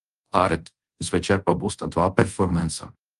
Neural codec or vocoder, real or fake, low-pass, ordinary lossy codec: codec, 24 kHz, 0.5 kbps, DualCodec; fake; 10.8 kHz; Opus, 32 kbps